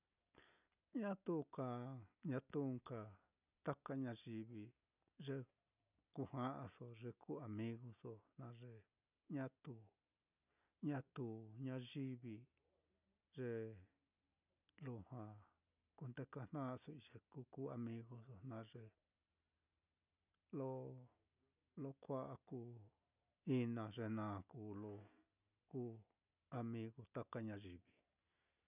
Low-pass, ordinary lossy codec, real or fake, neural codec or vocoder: 3.6 kHz; none; real; none